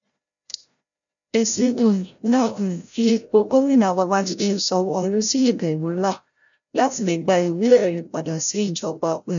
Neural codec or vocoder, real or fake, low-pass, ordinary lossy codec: codec, 16 kHz, 0.5 kbps, FreqCodec, larger model; fake; 7.2 kHz; none